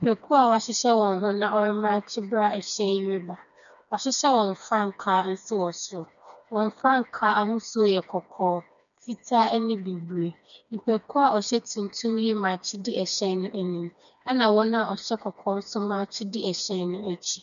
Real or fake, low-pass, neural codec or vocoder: fake; 7.2 kHz; codec, 16 kHz, 2 kbps, FreqCodec, smaller model